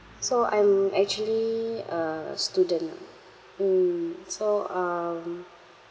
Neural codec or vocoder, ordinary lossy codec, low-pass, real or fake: none; none; none; real